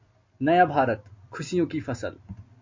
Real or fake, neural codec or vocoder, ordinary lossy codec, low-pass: real; none; MP3, 48 kbps; 7.2 kHz